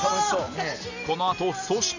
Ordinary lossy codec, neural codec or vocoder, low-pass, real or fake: none; none; 7.2 kHz; real